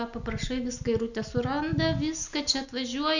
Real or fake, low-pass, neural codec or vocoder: real; 7.2 kHz; none